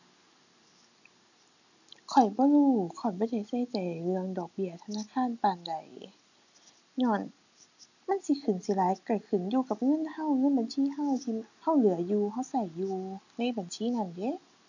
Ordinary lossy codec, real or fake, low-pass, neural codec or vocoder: none; real; 7.2 kHz; none